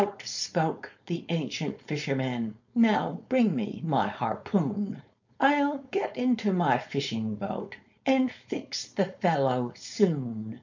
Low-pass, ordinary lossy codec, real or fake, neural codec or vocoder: 7.2 kHz; MP3, 48 kbps; fake; codec, 16 kHz, 4.8 kbps, FACodec